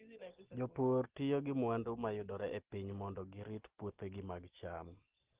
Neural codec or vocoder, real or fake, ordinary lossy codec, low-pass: none; real; Opus, 16 kbps; 3.6 kHz